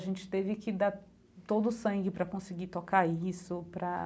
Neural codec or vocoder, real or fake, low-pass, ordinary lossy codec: none; real; none; none